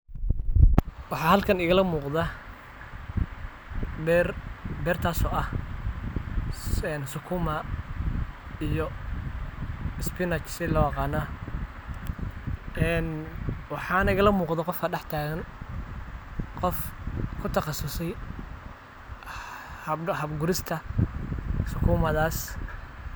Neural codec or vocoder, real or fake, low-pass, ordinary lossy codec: none; real; none; none